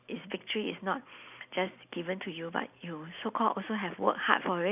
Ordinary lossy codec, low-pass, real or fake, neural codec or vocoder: none; 3.6 kHz; real; none